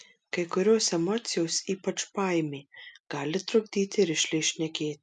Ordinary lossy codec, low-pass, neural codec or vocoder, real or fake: MP3, 96 kbps; 10.8 kHz; none; real